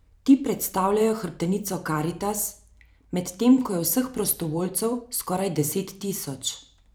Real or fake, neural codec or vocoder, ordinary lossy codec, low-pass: fake; vocoder, 44.1 kHz, 128 mel bands every 512 samples, BigVGAN v2; none; none